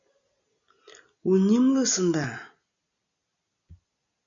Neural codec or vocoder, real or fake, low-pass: none; real; 7.2 kHz